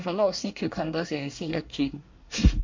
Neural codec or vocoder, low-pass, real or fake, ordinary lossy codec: codec, 24 kHz, 1 kbps, SNAC; 7.2 kHz; fake; MP3, 48 kbps